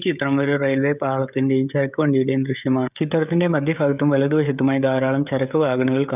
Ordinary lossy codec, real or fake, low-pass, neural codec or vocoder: none; fake; 3.6 kHz; codec, 16 kHz, 16 kbps, FreqCodec, smaller model